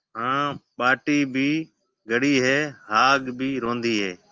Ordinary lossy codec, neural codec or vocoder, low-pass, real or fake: Opus, 24 kbps; none; 7.2 kHz; real